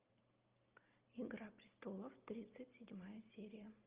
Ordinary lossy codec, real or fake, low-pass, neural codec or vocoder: Opus, 24 kbps; real; 3.6 kHz; none